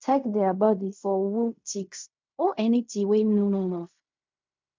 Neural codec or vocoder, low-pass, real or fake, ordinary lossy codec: codec, 16 kHz in and 24 kHz out, 0.4 kbps, LongCat-Audio-Codec, fine tuned four codebook decoder; 7.2 kHz; fake; MP3, 64 kbps